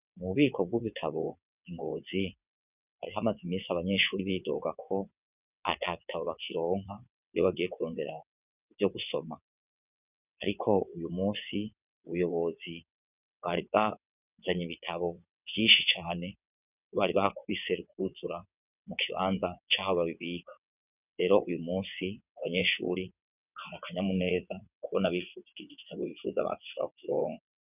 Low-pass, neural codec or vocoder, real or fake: 3.6 kHz; vocoder, 22.05 kHz, 80 mel bands, WaveNeXt; fake